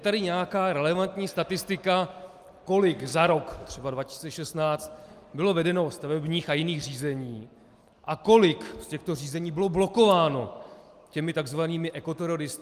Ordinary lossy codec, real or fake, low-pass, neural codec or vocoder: Opus, 32 kbps; real; 14.4 kHz; none